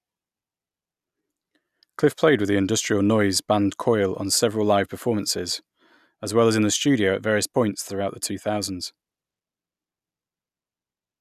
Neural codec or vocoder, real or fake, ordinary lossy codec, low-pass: none; real; none; 14.4 kHz